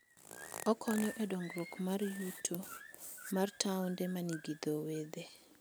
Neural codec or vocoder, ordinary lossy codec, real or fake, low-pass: none; none; real; none